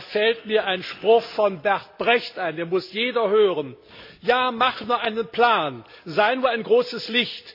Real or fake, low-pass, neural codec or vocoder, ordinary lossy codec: real; 5.4 kHz; none; MP3, 32 kbps